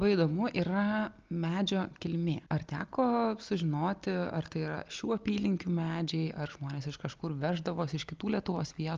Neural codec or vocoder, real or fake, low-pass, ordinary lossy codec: none; real; 7.2 kHz; Opus, 24 kbps